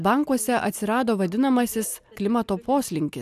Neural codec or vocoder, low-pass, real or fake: none; 14.4 kHz; real